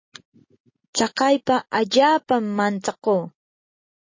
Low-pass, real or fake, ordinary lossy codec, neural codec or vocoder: 7.2 kHz; real; MP3, 32 kbps; none